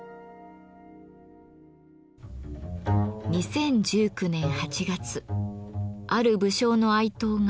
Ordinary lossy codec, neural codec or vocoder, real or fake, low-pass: none; none; real; none